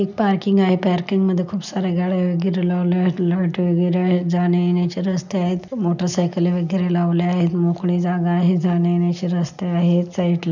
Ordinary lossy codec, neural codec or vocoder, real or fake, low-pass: none; none; real; 7.2 kHz